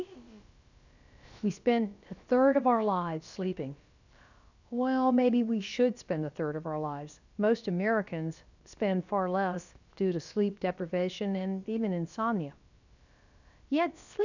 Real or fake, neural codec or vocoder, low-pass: fake; codec, 16 kHz, about 1 kbps, DyCAST, with the encoder's durations; 7.2 kHz